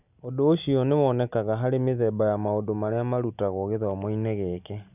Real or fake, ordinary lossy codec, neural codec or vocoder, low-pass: real; none; none; 3.6 kHz